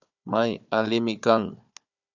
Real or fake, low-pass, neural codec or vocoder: fake; 7.2 kHz; codec, 16 kHz, 4 kbps, FunCodec, trained on Chinese and English, 50 frames a second